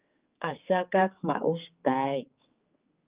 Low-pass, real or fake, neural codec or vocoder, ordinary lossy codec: 3.6 kHz; fake; codec, 16 kHz, 4 kbps, X-Codec, HuBERT features, trained on general audio; Opus, 24 kbps